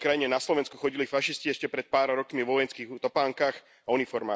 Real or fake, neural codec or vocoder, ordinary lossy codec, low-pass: real; none; none; none